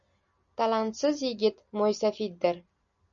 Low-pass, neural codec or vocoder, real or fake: 7.2 kHz; none; real